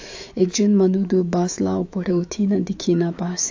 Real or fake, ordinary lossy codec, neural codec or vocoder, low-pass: fake; AAC, 48 kbps; autoencoder, 48 kHz, 128 numbers a frame, DAC-VAE, trained on Japanese speech; 7.2 kHz